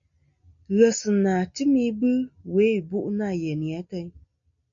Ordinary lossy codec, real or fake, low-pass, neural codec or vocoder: MP3, 48 kbps; real; 7.2 kHz; none